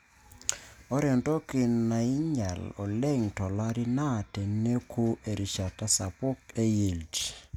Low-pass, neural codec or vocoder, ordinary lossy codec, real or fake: 19.8 kHz; none; none; real